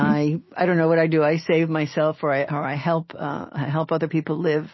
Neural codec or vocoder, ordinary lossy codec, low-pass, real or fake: none; MP3, 24 kbps; 7.2 kHz; real